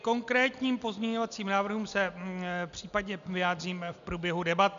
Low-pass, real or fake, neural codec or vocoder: 7.2 kHz; real; none